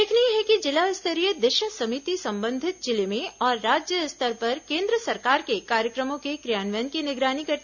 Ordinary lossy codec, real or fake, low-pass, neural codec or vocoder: none; real; 7.2 kHz; none